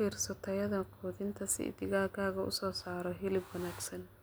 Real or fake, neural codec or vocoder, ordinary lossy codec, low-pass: real; none; none; none